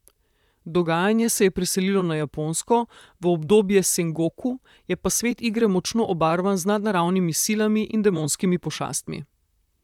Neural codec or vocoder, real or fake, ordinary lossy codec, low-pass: vocoder, 44.1 kHz, 128 mel bands, Pupu-Vocoder; fake; none; 19.8 kHz